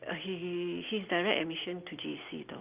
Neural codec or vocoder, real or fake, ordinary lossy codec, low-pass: none; real; Opus, 32 kbps; 3.6 kHz